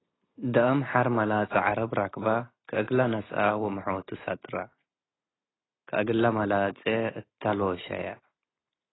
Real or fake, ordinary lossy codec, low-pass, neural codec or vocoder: real; AAC, 16 kbps; 7.2 kHz; none